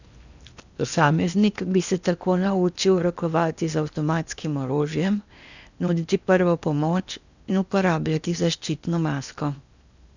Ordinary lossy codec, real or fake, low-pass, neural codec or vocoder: none; fake; 7.2 kHz; codec, 16 kHz in and 24 kHz out, 0.8 kbps, FocalCodec, streaming, 65536 codes